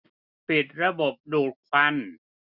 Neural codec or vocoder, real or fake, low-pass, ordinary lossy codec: none; real; 5.4 kHz; none